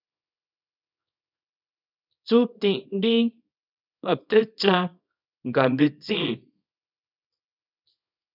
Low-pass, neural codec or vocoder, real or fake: 5.4 kHz; codec, 24 kHz, 0.9 kbps, WavTokenizer, small release; fake